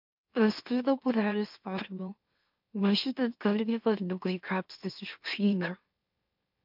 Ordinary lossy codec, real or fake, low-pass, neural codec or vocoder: MP3, 48 kbps; fake; 5.4 kHz; autoencoder, 44.1 kHz, a latent of 192 numbers a frame, MeloTTS